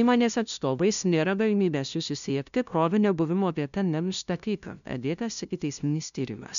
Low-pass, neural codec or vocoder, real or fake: 7.2 kHz; codec, 16 kHz, 0.5 kbps, FunCodec, trained on LibriTTS, 25 frames a second; fake